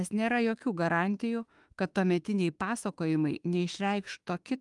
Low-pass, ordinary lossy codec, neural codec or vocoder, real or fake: 10.8 kHz; Opus, 32 kbps; autoencoder, 48 kHz, 32 numbers a frame, DAC-VAE, trained on Japanese speech; fake